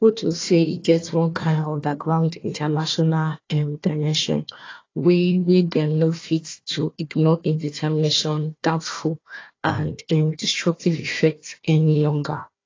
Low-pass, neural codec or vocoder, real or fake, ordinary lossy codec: 7.2 kHz; codec, 16 kHz, 1 kbps, FunCodec, trained on Chinese and English, 50 frames a second; fake; AAC, 32 kbps